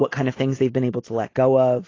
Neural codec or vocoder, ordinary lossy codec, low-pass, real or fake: none; AAC, 32 kbps; 7.2 kHz; real